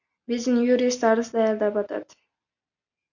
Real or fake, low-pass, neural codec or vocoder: real; 7.2 kHz; none